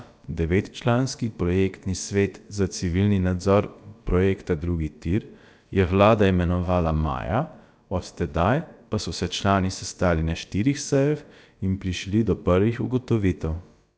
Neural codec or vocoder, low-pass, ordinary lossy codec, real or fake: codec, 16 kHz, about 1 kbps, DyCAST, with the encoder's durations; none; none; fake